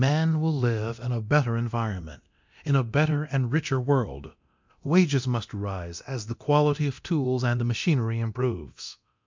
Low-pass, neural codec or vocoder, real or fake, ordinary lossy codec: 7.2 kHz; codec, 24 kHz, 0.9 kbps, DualCodec; fake; MP3, 64 kbps